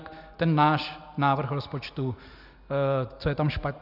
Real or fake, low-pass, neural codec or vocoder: real; 5.4 kHz; none